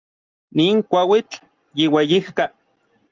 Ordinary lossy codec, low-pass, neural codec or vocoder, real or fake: Opus, 24 kbps; 7.2 kHz; none; real